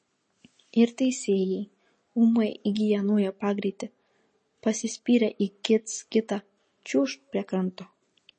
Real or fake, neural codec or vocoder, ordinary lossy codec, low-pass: real; none; MP3, 32 kbps; 10.8 kHz